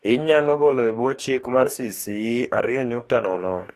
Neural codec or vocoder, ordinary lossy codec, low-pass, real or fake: codec, 44.1 kHz, 2.6 kbps, DAC; AAC, 64 kbps; 14.4 kHz; fake